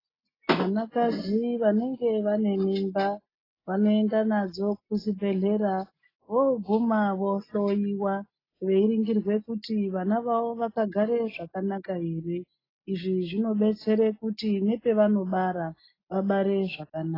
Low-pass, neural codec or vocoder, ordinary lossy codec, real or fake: 5.4 kHz; none; AAC, 24 kbps; real